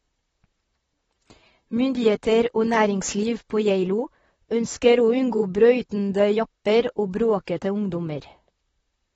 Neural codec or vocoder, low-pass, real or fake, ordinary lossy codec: vocoder, 44.1 kHz, 128 mel bands, Pupu-Vocoder; 19.8 kHz; fake; AAC, 24 kbps